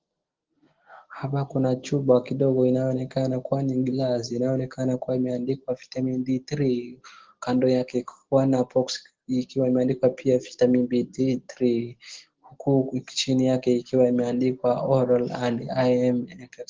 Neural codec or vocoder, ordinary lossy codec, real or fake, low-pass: none; Opus, 16 kbps; real; 7.2 kHz